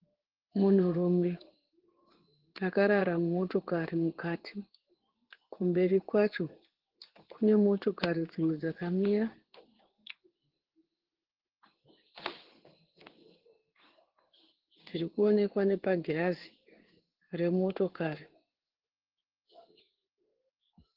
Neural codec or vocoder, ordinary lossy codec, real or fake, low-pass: codec, 16 kHz in and 24 kHz out, 1 kbps, XY-Tokenizer; Opus, 16 kbps; fake; 5.4 kHz